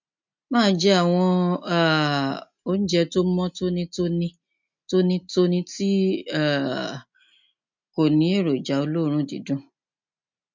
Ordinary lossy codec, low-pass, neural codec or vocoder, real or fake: MP3, 64 kbps; 7.2 kHz; none; real